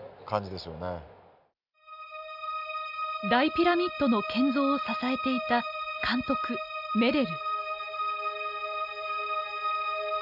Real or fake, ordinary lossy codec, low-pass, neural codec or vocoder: real; none; 5.4 kHz; none